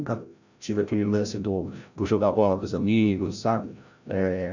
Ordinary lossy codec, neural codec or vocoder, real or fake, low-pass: none; codec, 16 kHz, 0.5 kbps, FreqCodec, larger model; fake; 7.2 kHz